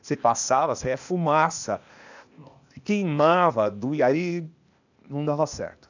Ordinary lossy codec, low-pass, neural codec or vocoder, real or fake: none; 7.2 kHz; codec, 16 kHz, 0.8 kbps, ZipCodec; fake